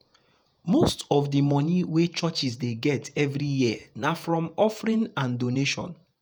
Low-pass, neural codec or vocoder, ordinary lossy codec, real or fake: none; vocoder, 48 kHz, 128 mel bands, Vocos; none; fake